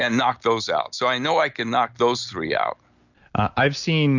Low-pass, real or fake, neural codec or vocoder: 7.2 kHz; real; none